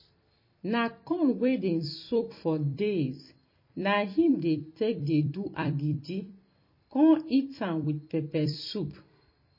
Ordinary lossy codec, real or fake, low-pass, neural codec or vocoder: MP3, 24 kbps; fake; 5.4 kHz; vocoder, 44.1 kHz, 128 mel bands every 256 samples, BigVGAN v2